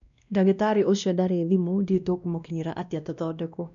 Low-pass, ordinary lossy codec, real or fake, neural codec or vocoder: 7.2 kHz; none; fake; codec, 16 kHz, 1 kbps, X-Codec, WavLM features, trained on Multilingual LibriSpeech